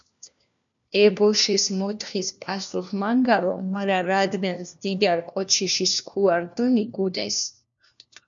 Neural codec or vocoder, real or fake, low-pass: codec, 16 kHz, 1 kbps, FunCodec, trained on LibriTTS, 50 frames a second; fake; 7.2 kHz